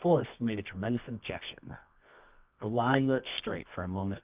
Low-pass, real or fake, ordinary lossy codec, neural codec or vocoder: 3.6 kHz; fake; Opus, 24 kbps; codec, 24 kHz, 0.9 kbps, WavTokenizer, medium music audio release